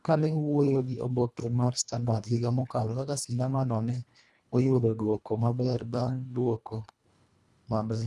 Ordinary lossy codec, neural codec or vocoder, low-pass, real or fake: none; codec, 24 kHz, 1.5 kbps, HILCodec; none; fake